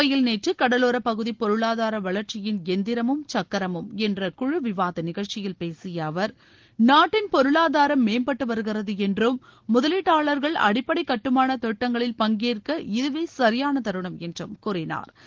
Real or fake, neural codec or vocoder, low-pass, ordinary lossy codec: real; none; 7.2 kHz; Opus, 16 kbps